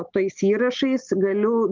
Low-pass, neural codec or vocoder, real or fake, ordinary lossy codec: 7.2 kHz; none; real; Opus, 24 kbps